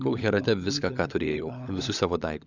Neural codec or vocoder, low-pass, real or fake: codec, 16 kHz, 16 kbps, FunCodec, trained on LibriTTS, 50 frames a second; 7.2 kHz; fake